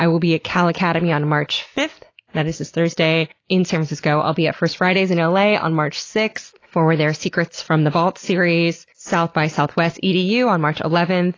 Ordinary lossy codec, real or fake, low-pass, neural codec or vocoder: AAC, 32 kbps; real; 7.2 kHz; none